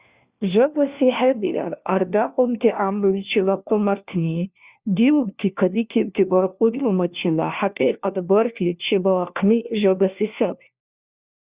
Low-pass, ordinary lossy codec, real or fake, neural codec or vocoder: 3.6 kHz; Opus, 64 kbps; fake; codec, 16 kHz, 1 kbps, FunCodec, trained on LibriTTS, 50 frames a second